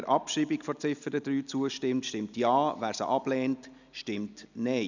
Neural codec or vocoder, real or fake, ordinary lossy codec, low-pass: none; real; none; 7.2 kHz